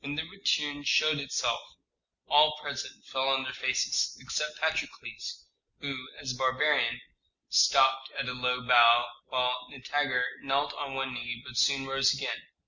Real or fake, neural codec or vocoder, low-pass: real; none; 7.2 kHz